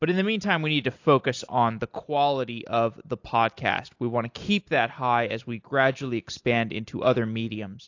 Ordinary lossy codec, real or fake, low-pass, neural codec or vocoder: AAC, 48 kbps; real; 7.2 kHz; none